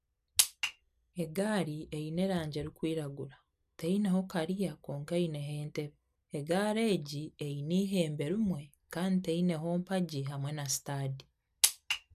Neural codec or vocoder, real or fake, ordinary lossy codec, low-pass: none; real; none; 14.4 kHz